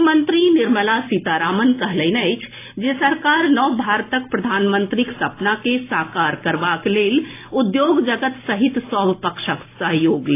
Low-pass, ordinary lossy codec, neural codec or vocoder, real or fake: 3.6 kHz; AAC, 24 kbps; none; real